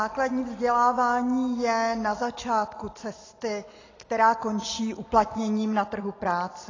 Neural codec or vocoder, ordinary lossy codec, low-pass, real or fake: none; AAC, 32 kbps; 7.2 kHz; real